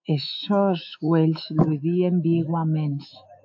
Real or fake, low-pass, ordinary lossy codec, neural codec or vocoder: fake; 7.2 kHz; AAC, 48 kbps; autoencoder, 48 kHz, 128 numbers a frame, DAC-VAE, trained on Japanese speech